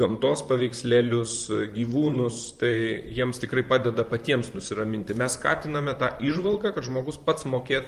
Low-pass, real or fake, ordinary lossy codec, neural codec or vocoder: 14.4 kHz; fake; Opus, 32 kbps; vocoder, 44.1 kHz, 128 mel bands, Pupu-Vocoder